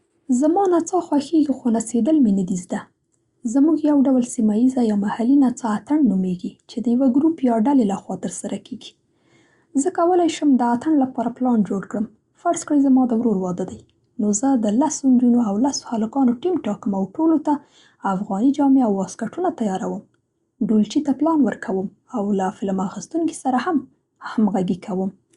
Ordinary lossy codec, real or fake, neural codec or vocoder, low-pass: Opus, 64 kbps; real; none; 10.8 kHz